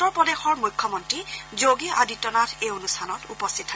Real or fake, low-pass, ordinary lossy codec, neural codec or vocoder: real; none; none; none